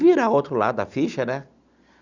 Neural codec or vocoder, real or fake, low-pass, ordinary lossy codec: none; real; 7.2 kHz; Opus, 64 kbps